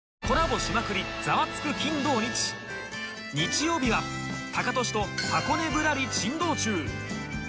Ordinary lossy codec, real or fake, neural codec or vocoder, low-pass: none; real; none; none